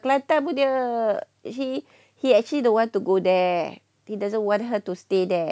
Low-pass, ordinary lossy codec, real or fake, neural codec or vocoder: none; none; real; none